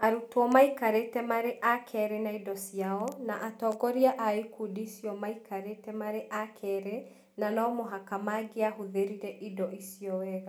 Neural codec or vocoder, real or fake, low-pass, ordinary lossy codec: none; real; none; none